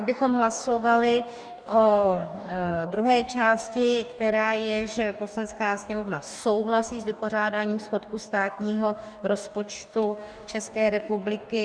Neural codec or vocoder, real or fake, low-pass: codec, 44.1 kHz, 2.6 kbps, DAC; fake; 9.9 kHz